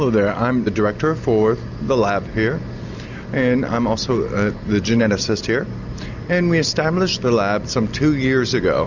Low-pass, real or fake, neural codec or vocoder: 7.2 kHz; real; none